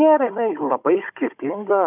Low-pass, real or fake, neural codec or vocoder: 3.6 kHz; fake; codec, 16 kHz, 16 kbps, FunCodec, trained on Chinese and English, 50 frames a second